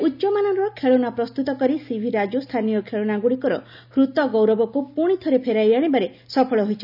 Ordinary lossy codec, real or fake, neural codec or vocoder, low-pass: none; real; none; 5.4 kHz